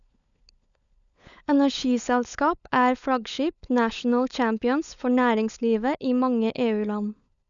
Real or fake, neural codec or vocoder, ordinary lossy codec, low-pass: fake; codec, 16 kHz, 16 kbps, FunCodec, trained on LibriTTS, 50 frames a second; none; 7.2 kHz